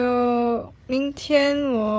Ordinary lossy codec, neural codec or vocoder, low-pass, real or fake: none; codec, 16 kHz, 8 kbps, FreqCodec, larger model; none; fake